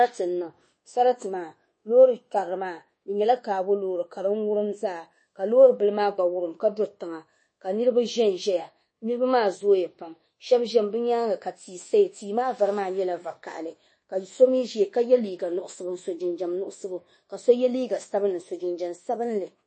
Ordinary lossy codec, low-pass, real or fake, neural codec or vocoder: MP3, 32 kbps; 9.9 kHz; fake; codec, 24 kHz, 1.2 kbps, DualCodec